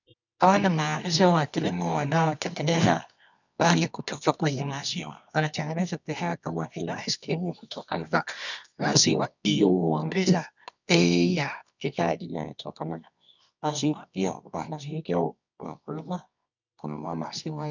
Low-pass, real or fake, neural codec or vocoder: 7.2 kHz; fake; codec, 24 kHz, 0.9 kbps, WavTokenizer, medium music audio release